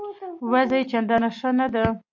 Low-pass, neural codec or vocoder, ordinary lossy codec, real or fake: 7.2 kHz; none; MP3, 48 kbps; real